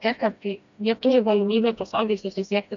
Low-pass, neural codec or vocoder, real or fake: 7.2 kHz; codec, 16 kHz, 1 kbps, FreqCodec, smaller model; fake